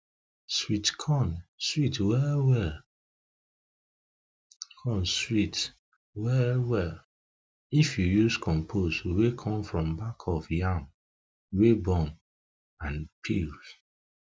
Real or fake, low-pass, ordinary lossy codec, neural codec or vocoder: real; none; none; none